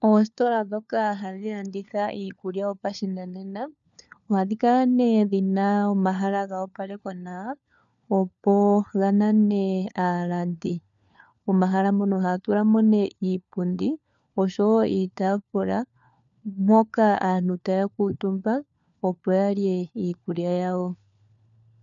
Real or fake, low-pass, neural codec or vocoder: fake; 7.2 kHz; codec, 16 kHz, 4 kbps, FunCodec, trained on LibriTTS, 50 frames a second